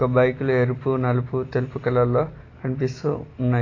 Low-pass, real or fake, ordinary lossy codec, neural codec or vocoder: 7.2 kHz; real; AAC, 32 kbps; none